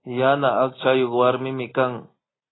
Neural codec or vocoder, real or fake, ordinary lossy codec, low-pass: none; real; AAC, 16 kbps; 7.2 kHz